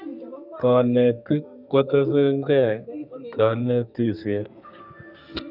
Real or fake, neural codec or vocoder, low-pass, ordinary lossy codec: fake; codec, 32 kHz, 1.9 kbps, SNAC; 5.4 kHz; none